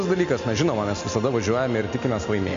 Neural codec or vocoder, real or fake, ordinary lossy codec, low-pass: none; real; MP3, 48 kbps; 7.2 kHz